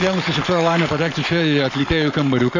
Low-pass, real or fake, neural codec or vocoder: 7.2 kHz; fake; codec, 44.1 kHz, 7.8 kbps, Pupu-Codec